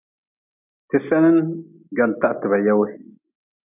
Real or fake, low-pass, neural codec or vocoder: real; 3.6 kHz; none